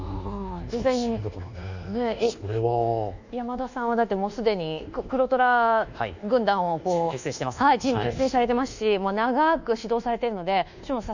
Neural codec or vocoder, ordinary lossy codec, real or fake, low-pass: codec, 24 kHz, 1.2 kbps, DualCodec; none; fake; 7.2 kHz